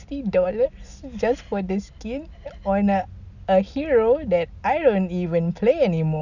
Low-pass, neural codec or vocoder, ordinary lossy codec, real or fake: 7.2 kHz; none; none; real